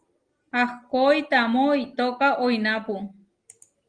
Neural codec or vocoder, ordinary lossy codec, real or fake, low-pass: none; Opus, 24 kbps; real; 9.9 kHz